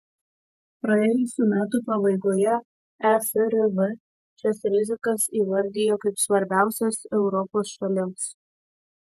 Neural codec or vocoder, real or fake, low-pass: vocoder, 48 kHz, 128 mel bands, Vocos; fake; 14.4 kHz